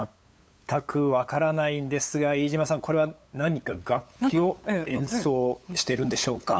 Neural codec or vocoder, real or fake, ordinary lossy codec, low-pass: codec, 16 kHz, 8 kbps, FunCodec, trained on LibriTTS, 25 frames a second; fake; none; none